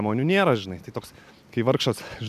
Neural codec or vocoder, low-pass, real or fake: none; 14.4 kHz; real